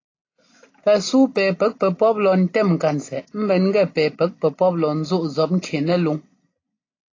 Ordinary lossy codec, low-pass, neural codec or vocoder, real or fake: AAC, 32 kbps; 7.2 kHz; none; real